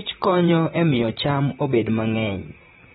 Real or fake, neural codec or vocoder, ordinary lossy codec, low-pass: fake; vocoder, 44.1 kHz, 128 mel bands, Pupu-Vocoder; AAC, 16 kbps; 19.8 kHz